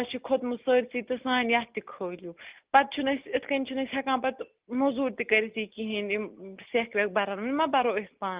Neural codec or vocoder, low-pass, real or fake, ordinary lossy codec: none; 3.6 kHz; real; Opus, 32 kbps